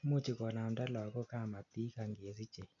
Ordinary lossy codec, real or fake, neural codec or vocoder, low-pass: none; real; none; 7.2 kHz